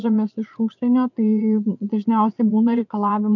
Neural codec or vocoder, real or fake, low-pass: vocoder, 22.05 kHz, 80 mel bands, Vocos; fake; 7.2 kHz